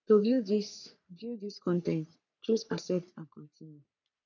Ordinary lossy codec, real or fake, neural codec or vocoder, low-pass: none; fake; codec, 44.1 kHz, 3.4 kbps, Pupu-Codec; 7.2 kHz